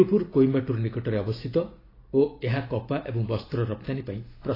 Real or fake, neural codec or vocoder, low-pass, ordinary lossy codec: real; none; 5.4 kHz; AAC, 24 kbps